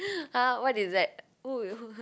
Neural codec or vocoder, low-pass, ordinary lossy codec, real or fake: none; none; none; real